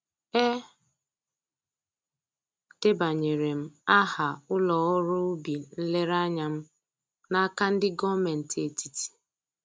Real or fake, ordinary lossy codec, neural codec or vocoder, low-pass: real; none; none; none